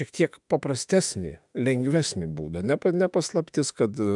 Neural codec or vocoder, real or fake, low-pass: autoencoder, 48 kHz, 32 numbers a frame, DAC-VAE, trained on Japanese speech; fake; 10.8 kHz